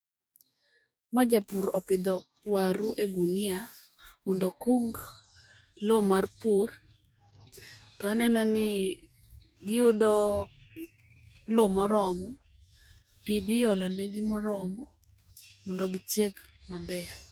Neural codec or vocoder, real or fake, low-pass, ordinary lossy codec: codec, 44.1 kHz, 2.6 kbps, DAC; fake; none; none